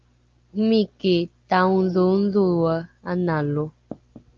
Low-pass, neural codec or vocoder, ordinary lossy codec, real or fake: 7.2 kHz; none; Opus, 24 kbps; real